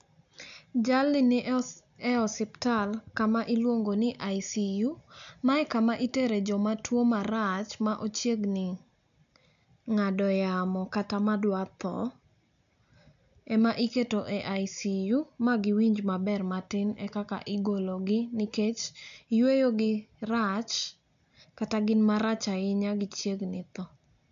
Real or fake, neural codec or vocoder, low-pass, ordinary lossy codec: real; none; 7.2 kHz; none